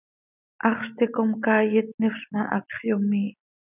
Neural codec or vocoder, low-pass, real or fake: none; 3.6 kHz; real